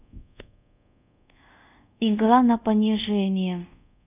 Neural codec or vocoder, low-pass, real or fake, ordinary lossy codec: codec, 24 kHz, 0.5 kbps, DualCodec; 3.6 kHz; fake; none